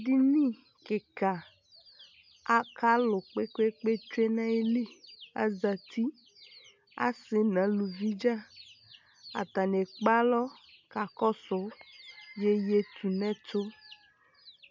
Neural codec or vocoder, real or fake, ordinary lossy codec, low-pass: none; real; MP3, 64 kbps; 7.2 kHz